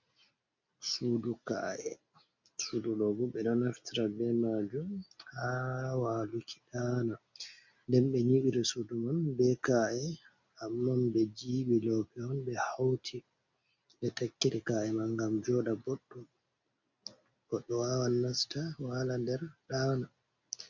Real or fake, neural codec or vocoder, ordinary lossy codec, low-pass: real; none; MP3, 64 kbps; 7.2 kHz